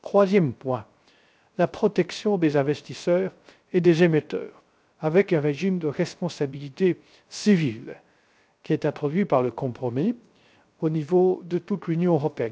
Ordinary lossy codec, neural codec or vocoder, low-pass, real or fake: none; codec, 16 kHz, 0.3 kbps, FocalCodec; none; fake